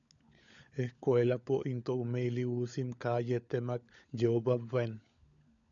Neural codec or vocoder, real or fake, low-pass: codec, 16 kHz, 16 kbps, FunCodec, trained on LibriTTS, 50 frames a second; fake; 7.2 kHz